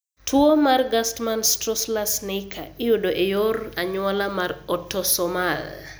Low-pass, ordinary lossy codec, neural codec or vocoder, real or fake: none; none; none; real